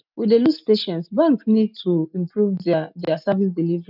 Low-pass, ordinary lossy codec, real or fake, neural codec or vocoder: 5.4 kHz; none; real; none